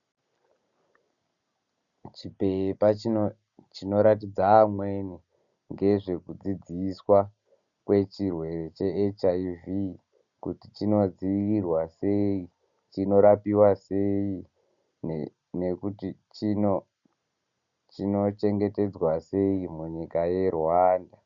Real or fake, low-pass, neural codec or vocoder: real; 7.2 kHz; none